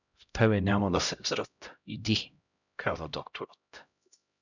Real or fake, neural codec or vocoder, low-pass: fake; codec, 16 kHz, 0.5 kbps, X-Codec, HuBERT features, trained on LibriSpeech; 7.2 kHz